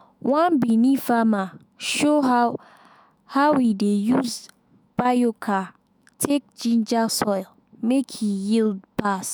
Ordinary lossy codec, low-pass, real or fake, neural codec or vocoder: none; none; fake; autoencoder, 48 kHz, 128 numbers a frame, DAC-VAE, trained on Japanese speech